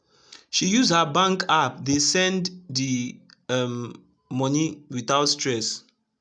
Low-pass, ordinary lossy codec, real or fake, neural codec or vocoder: 9.9 kHz; none; real; none